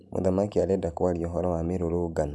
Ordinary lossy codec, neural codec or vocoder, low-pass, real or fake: none; none; none; real